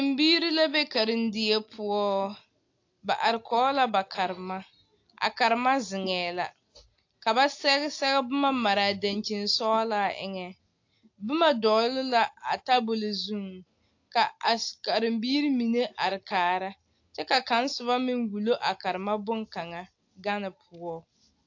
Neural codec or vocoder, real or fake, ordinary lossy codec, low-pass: none; real; AAC, 48 kbps; 7.2 kHz